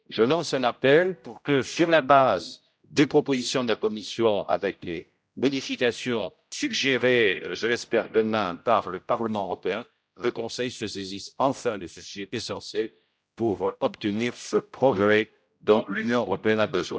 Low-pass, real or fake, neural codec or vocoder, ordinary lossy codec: none; fake; codec, 16 kHz, 0.5 kbps, X-Codec, HuBERT features, trained on general audio; none